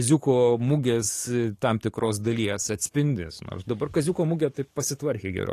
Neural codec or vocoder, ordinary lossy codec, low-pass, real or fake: codec, 44.1 kHz, 7.8 kbps, DAC; AAC, 48 kbps; 14.4 kHz; fake